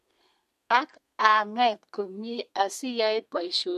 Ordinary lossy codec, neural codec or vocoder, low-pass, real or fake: MP3, 64 kbps; codec, 32 kHz, 1.9 kbps, SNAC; 14.4 kHz; fake